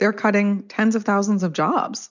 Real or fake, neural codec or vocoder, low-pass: real; none; 7.2 kHz